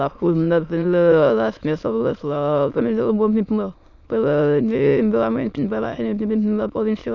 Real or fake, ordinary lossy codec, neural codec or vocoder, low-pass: fake; none; autoencoder, 22.05 kHz, a latent of 192 numbers a frame, VITS, trained on many speakers; 7.2 kHz